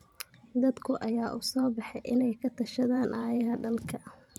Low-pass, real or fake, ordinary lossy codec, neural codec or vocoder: 19.8 kHz; fake; none; vocoder, 44.1 kHz, 128 mel bands every 512 samples, BigVGAN v2